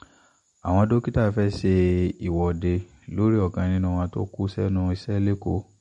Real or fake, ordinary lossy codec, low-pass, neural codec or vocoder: real; MP3, 48 kbps; 19.8 kHz; none